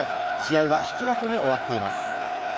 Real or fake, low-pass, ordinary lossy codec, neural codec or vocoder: fake; none; none; codec, 16 kHz, 2 kbps, FreqCodec, larger model